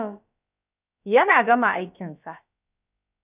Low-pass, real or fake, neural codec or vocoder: 3.6 kHz; fake; codec, 16 kHz, about 1 kbps, DyCAST, with the encoder's durations